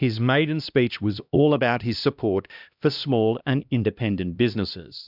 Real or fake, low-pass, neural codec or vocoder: fake; 5.4 kHz; codec, 16 kHz, 1 kbps, X-Codec, HuBERT features, trained on LibriSpeech